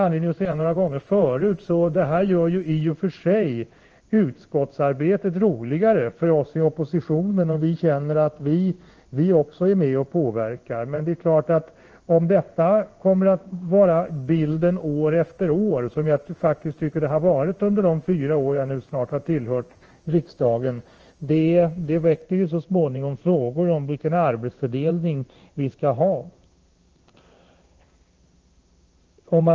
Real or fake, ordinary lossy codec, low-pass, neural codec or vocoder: fake; Opus, 24 kbps; 7.2 kHz; codec, 16 kHz in and 24 kHz out, 1 kbps, XY-Tokenizer